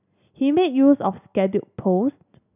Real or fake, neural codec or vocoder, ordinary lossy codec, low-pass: real; none; none; 3.6 kHz